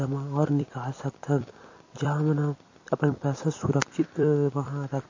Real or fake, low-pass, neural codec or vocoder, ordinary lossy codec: fake; 7.2 kHz; vocoder, 44.1 kHz, 128 mel bands every 256 samples, BigVGAN v2; MP3, 32 kbps